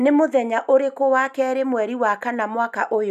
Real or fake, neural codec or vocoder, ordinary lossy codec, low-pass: real; none; none; 14.4 kHz